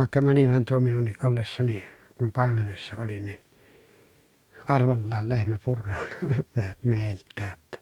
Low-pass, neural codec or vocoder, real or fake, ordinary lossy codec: 19.8 kHz; codec, 44.1 kHz, 2.6 kbps, DAC; fake; Opus, 64 kbps